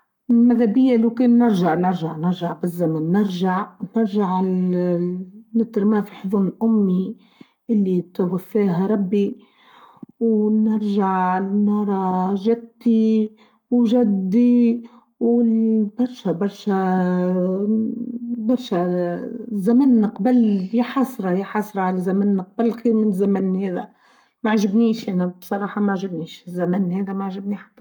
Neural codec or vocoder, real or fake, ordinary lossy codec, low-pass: codec, 44.1 kHz, 7.8 kbps, Pupu-Codec; fake; none; 19.8 kHz